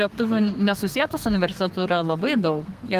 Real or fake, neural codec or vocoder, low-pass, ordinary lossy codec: fake; codec, 32 kHz, 1.9 kbps, SNAC; 14.4 kHz; Opus, 32 kbps